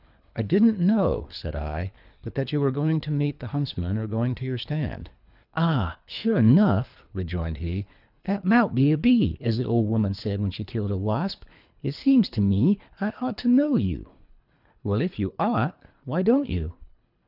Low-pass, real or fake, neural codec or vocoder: 5.4 kHz; fake; codec, 24 kHz, 3 kbps, HILCodec